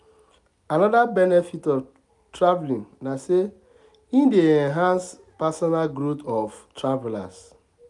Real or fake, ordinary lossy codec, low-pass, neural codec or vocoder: real; none; 10.8 kHz; none